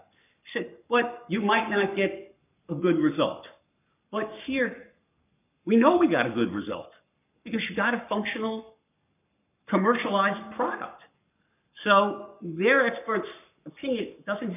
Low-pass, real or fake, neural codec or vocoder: 3.6 kHz; fake; codec, 44.1 kHz, 7.8 kbps, Pupu-Codec